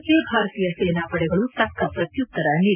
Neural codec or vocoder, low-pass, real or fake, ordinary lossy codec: none; 3.6 kHz; real; none